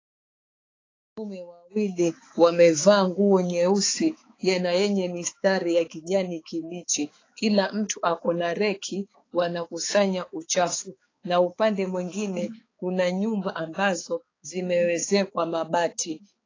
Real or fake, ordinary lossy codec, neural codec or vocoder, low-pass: fake; AAC, 32 kbps; codec, 16 kHz, 4 kbps, X-Codec, HuBERT features, trained on balanced general audio; 7.2 kHz